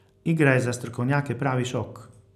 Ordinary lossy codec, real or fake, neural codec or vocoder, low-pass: none; real; none; 14.4 kHz